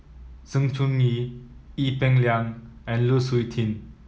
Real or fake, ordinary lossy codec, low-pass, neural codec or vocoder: real; none; none; none